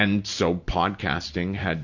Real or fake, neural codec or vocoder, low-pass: real; none; 7.2 kHz